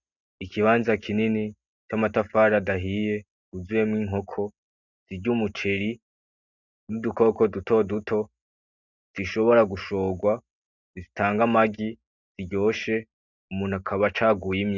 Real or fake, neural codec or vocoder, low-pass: real; none; 7.2 kHz